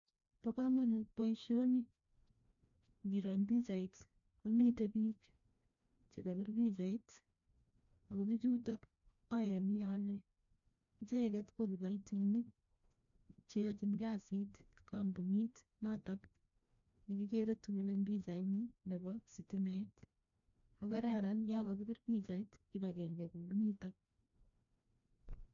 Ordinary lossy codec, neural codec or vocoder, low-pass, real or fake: AAC, 64 kbps; codec, 16 kHz, 1 kbps, FreqCodec, larger model; 7.2 kHz; fake